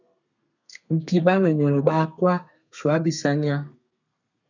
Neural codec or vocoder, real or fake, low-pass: codec, 32 kHz, 1.9 kbps, SNAC; fake; 7.2 kHz